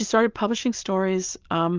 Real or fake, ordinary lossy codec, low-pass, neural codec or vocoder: real; Opus, 32 kbps; 7.2 kHz; none